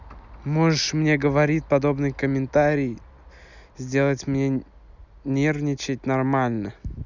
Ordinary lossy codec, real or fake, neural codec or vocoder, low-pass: none; real; none; 7.2 kHz